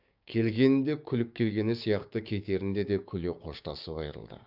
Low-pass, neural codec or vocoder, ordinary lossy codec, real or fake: 5.4 kHz; codec, 16 kHz, 6 kbps, DAC; none; fake